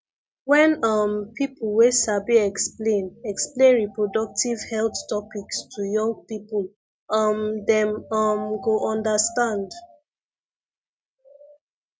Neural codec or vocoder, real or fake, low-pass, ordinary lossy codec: none; real; none; none